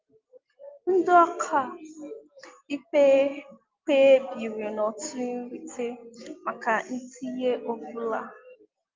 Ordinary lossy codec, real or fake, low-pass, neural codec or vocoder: Opus, 24 kbps; real; 7.2 kHz; none